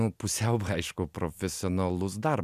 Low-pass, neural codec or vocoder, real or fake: 14.4 kHz; none; real